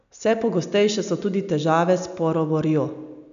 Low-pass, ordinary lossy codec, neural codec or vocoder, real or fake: 7.2 kHz; none; none; real